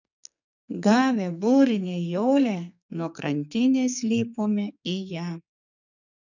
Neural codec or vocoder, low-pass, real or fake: codec, 44.1 kHz, 2.6 kbps, SNAC; 7.2 kHz; fake